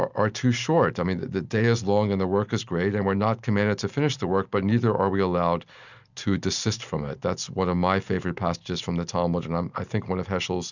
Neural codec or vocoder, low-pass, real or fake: none; 7.2 kHz; real